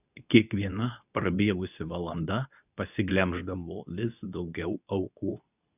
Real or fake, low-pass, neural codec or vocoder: fake; 3.6 kHz; codec, 24 kHz, 0.9 kbps, WavTokenizer, medium speech release version 2